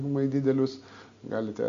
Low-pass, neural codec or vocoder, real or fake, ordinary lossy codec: 7.2 kHz; none; real; MP3, 64 kbps